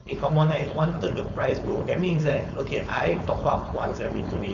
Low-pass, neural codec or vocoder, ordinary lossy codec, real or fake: 7.2 kHz; codec, 16 kHz, 4.8 kbps, FACodec; none; fake